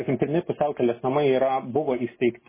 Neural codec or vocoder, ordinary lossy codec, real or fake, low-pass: none; MP3, 16 kbps; real; 3.6 kHz